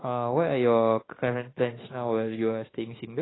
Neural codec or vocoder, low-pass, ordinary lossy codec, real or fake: autoencoder, 48 kHz, 32 numbers a frame, DAC-VAE, trained on Japanese speech; 7.2 kHz; AAC, 16 kbps; fake